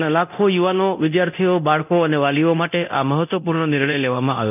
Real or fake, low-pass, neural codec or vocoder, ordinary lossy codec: fake; 3.6 kHz; codec, 24 kHz, 0.9 kbps, DualCodec; none